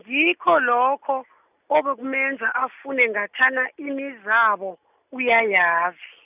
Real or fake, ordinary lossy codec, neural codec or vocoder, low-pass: real; none; none; 3.6 kHz